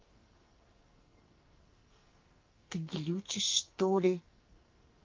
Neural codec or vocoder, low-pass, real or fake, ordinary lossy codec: codec, 44.1 kHz, 2.6 kbps, SNAC; 7.2 kHz; fake; Opus, 32 kbps